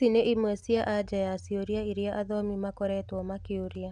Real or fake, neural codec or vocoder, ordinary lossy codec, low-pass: real; none; none; none